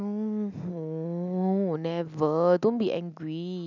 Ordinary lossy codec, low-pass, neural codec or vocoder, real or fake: none; 7.2 kHz; none; real